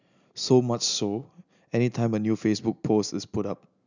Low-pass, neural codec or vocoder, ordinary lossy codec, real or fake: 7.2 kHz; none; none; real